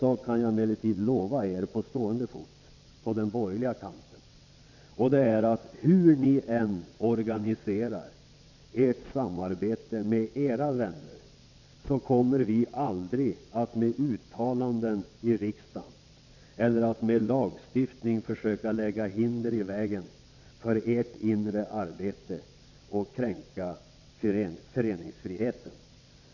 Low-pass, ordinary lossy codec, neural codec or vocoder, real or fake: 7.2 kHz; none; vocoder, 22.05 kHz, 80 mel bands, WaveNeXt; fake